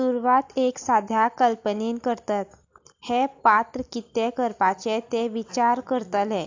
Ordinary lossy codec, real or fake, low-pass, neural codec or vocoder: AAC, 48 kbps; real; 7.2 kHz; none